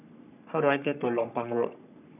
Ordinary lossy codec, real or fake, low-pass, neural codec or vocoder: none; fake; 3.6 kHz; codec, 44.1 kHz, 3.4 kbps, Pupu-Codec